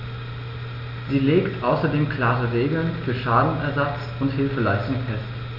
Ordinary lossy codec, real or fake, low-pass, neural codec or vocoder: none; real; 5.4 kHz; none